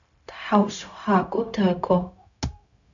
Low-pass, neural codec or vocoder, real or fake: 7.2 kHz; codec, 16 kHz, 0.4 kbps, LongCat-Audio-Codec; fake